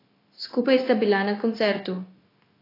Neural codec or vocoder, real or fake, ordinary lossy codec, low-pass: codec, 16 kHz, 0.9 kbps, LongCat-Audio-Codec; fake; AAC, 24 kbps; 5.4 kHz